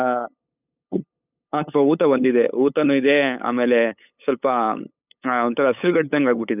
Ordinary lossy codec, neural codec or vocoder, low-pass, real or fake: none; codec, 16 kHz, 8 kbps, FunCodec, trained on LibriTTS, 25 frames a second; 3.6 kHz; fake